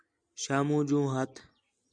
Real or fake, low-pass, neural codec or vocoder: real; 9.9 kHz; none